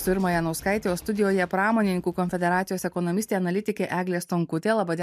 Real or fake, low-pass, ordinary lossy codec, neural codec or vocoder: real; 14.4 kHz; MP3, 96 kbps; none